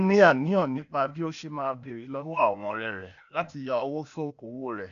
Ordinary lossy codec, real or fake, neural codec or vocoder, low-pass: none; fake; codec, 16 kHz, 0.8 kbps, ZipCodec; 7.2 kHz